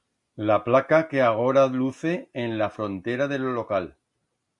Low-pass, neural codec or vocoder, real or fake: 10.8 kHz; none; real